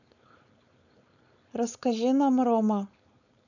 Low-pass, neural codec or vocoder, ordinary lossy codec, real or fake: 7.2 kHz; codec, 16 kHz, 4.8 kbps, FACodec; none; fake